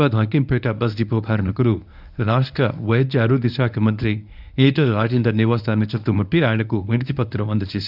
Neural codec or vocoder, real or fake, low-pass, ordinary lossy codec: codec, 24 kHz, 0.9 kbps, WavTokenizer, medium speech release version 1; fake; 5.4 kHz; none